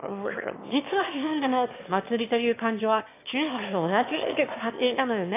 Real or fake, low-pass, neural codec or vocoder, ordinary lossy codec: fake; 3.6 kHz; autoencoder, 22.05 kHz, a latent of 192 numbers a frame, VITS, trained on one speaker; AAC, 32 kbps